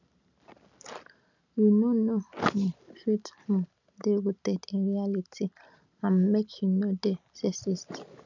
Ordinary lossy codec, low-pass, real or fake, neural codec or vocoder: none; 7.2 kHz; real; none